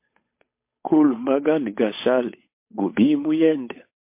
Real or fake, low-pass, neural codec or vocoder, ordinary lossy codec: fake; 3.6 kHz; codec, 16 kHz, 8 kbps, FunCodec, trained on Chinese and English, 25 frames a second; MP3, 24 kbps